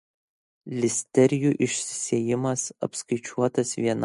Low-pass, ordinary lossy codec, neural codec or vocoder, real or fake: 14.4 kHz; MP3, 48 kbps; none; real